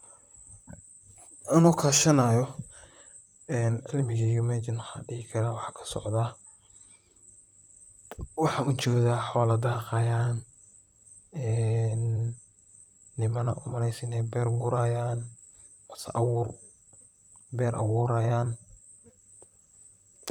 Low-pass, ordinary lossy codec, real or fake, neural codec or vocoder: 19.8 kHz; none; fake; vocoder, 44.1 kHz, 128 mel bands, Pupu-Vocoder